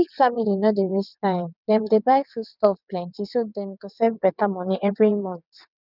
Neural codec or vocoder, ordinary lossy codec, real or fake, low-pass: vocoder, 22.05 kHz, 80 mel bands, WaveNeXt; none; fake; 5.4 kHz